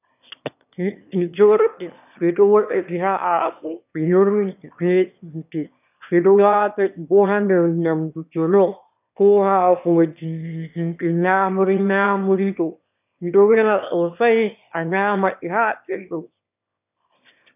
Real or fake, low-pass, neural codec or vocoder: fake; 3.6 kHz; autoencoder, 22.05 kHz, a latent of 192 numbers a frame, VITS, trained on one speaker